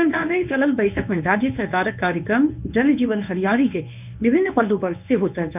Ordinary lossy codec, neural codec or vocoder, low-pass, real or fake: none; codec, 24 kHz, 0.9 kbps, WavTokenizer, medium speech release version 1; 3.6 kHz; fake